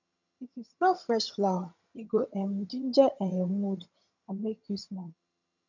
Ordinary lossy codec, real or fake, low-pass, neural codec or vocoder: none; fake; 7.2 kHz; vocoder, 22.05 kHz, 80 mel bands, HiFi-GAN